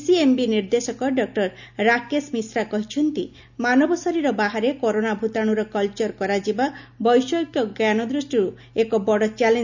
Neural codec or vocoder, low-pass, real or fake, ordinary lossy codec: none; 7.2 kHz; real; none